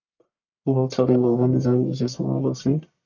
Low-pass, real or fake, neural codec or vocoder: 7.2 kHz; fake; codec, 44.1 kHz, 1.7 kbps, Pupu-Codec